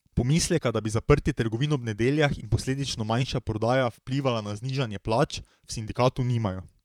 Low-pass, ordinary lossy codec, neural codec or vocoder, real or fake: 19.8 kHz; none; vocoder, 44.1 kHz, 128 mel bands, Pupu-Vocoder; fake